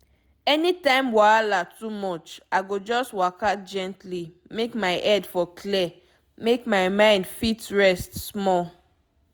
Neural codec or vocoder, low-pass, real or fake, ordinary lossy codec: none; none; real; none